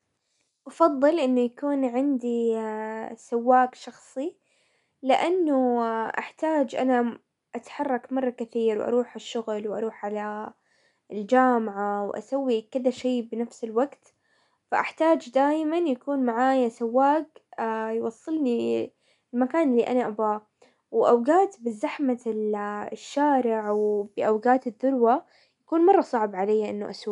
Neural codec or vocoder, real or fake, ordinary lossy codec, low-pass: none; real; none; 10.8 kHz